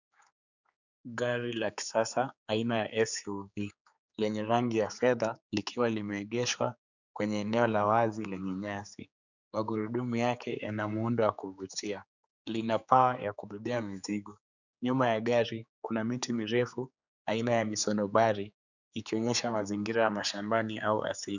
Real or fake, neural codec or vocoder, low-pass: fake; codec, 16 kHz, 4 kbps, X-Codec, HuBERT features, trained on general audio; 7.2 kHz